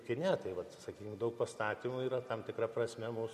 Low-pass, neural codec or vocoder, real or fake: 14.4 kHz; none; real